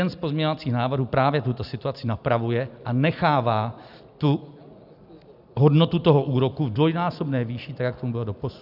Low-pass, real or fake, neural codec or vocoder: 5.4 kHz; real; none